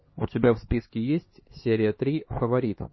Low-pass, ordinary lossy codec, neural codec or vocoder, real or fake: 7.2 kHz; MP3, 24 kbps; codec, 16 kHz, 2 kbps, X-Codec, HuBERT features, trained on LibriSpeech; fake